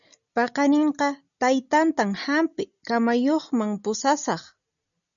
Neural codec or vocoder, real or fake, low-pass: none; real; 7.2 kHz